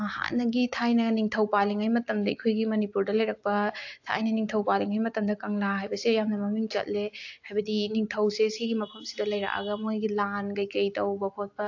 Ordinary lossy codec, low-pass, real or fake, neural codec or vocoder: AAC, 48 kbps; 7.2 kHz; real; none